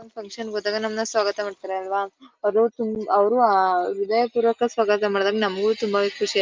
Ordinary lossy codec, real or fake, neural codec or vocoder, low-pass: Opus, 32 kbps; real; none; 7.2 kHz